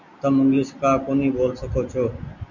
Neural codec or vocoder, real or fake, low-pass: none; real; 7.2 kHz